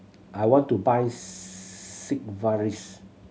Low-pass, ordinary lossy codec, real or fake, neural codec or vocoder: none; none; real; none